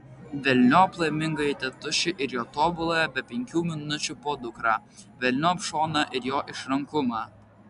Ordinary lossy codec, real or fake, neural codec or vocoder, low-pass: MP3, 96 kbps; real; none; 10.8 kHz